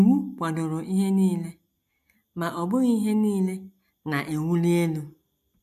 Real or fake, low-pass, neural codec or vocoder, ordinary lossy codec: real; 14.4 kHz; none; AAC, 96 kbps